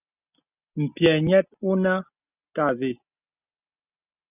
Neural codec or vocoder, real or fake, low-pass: none; real; 3.6 kHz